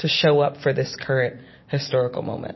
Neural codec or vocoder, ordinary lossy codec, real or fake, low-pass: vocoder, 44.1 kHz, 128 mel bands every 256 samples, BigVGAN v2; MP3, 24 kbps; fake; 7.2 kHz